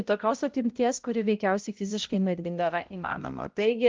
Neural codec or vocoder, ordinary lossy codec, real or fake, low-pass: codec, 16 kHz, 0.5 kbps, X-Codec, HuBERT features, trained on balanced general audio; Opus, 32 kbps; fake; 7.2 kHz